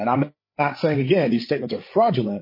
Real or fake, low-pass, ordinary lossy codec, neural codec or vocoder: fake; 5.4 kHz; MP3, 24 kbps; codec, 16 kHz, 8 kbps, FreqCodec, larger model